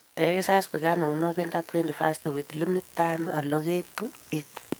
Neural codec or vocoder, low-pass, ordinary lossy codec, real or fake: codec, 44.1 kHz, 2.6 kbps, SNAC; none; none; fake